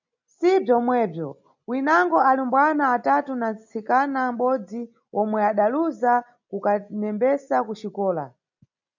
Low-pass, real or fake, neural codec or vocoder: 7.2 kHz; real; none